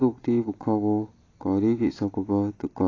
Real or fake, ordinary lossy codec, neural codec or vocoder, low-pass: real; AAC, 32 kbps; none; 7.2 kHz